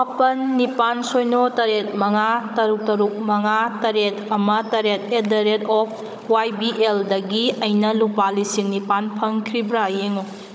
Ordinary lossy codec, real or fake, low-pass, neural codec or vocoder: none; fake; none; codec, 16 kHz, 16 kbps, FunCodec, trained on Chinese and English, 50 frames a second